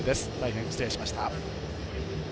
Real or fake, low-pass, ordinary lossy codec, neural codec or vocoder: real; none; none; none